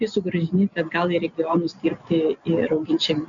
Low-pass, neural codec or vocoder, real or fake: 7.2 kHz; none; real